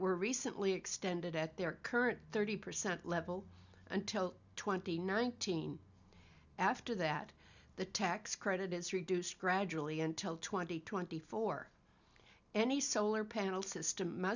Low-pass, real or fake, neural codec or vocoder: 7.2 kHz; real; none